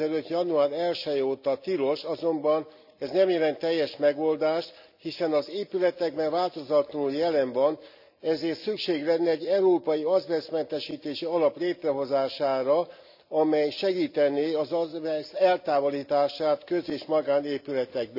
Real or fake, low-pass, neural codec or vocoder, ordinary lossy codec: real; 5.4 kHz; none; none